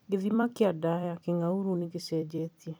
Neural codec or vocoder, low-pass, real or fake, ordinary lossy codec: vocoder, 44.1 kHz, 128 mel bands every 256 samples, BigVGAN v2; none; fake; none